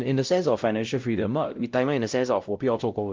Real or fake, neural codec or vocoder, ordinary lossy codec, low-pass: fake; codec, 16 kHz, 0.5 kbps, X-Codec, WavLM features, trained on Multilingual LibriSpeech; Opus, 32 kbps; 7.2 kHz